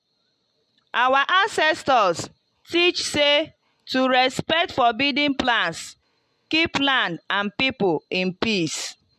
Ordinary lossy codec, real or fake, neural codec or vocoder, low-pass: MP3, 64 kbps; real; none; 14.4 kHz